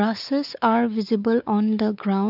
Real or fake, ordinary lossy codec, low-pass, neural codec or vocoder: fake; none; 5.4 kHz; codec, 16 kHz, 4 kbps, X-Codec, WavLM features, trained on Multilingual LibriSpeech